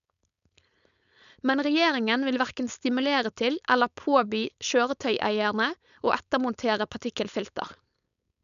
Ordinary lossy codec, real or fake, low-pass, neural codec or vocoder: none; fake; 7.2 kHz; codec, 16 kHz, 4.8 kbps, FACodec